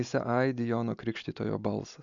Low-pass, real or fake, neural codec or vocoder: 7.2 kHz; real; none